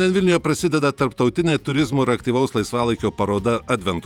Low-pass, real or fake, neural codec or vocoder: 19.8 kHz; fake; vocoder, 48 kHz, 128 mel bands, Vocos